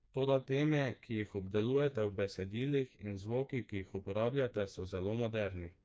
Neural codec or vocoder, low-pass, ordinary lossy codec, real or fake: codec, 16 kHz, 2 kbps, FreqCodec, smaller model; none; none; fake